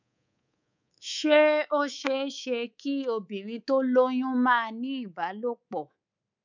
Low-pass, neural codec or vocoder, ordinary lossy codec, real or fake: 7.2 kHz; codec, 24 kHz, 3.1 kbps, DualCodec; none; fake